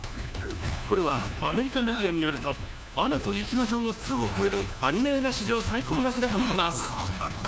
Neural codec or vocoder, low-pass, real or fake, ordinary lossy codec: codec, 16 kHz, 1 kbps, FunCodec, trained on LibriTTS, 50 frames a second; none; fake; none